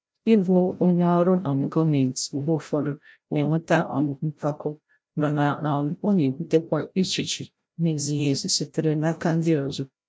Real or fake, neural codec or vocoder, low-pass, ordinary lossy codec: fake; codec, 16 kHz, 0.5 kbps, FreqCodec, larger model; none; none